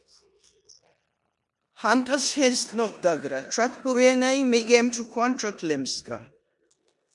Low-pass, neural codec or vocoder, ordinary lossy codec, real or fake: 10.8 kHz; codec, 16 kHz in and 24 kHz out, 0.9 kbps, LongCat-Audio-Codec, four codebook decoder; MP3, 64 kbps; fake